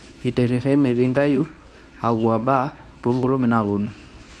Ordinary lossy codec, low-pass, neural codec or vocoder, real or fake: none; none; codec, 24 kHz, 0.9 kbps, WavTokenizer, medium speech release version 1; fake